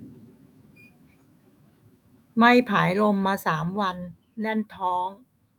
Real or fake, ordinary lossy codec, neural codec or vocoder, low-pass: fake; none; autoencoder, 48 kHz, 128 numbers a frame, DAC-VAE, trained on Japanese speech; 19.8 kHz